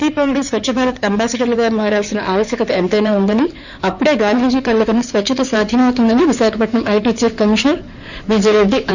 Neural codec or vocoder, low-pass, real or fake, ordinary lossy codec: codec, 16 kHz in and 24 kHz out, 2.2 kbps, FireRedTTS-2 codec; 7.2 kHz; fake; none